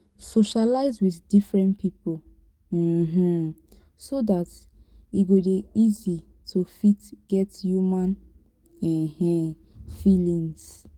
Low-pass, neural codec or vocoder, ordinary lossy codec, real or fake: 19.8 kHz; codec, 44.1 kHz, 7.8 kbps, DAC; Opus, 24 kbps; fake